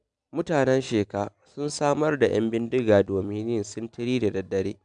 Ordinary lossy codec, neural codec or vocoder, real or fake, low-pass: none; none; real; 9.9 kHz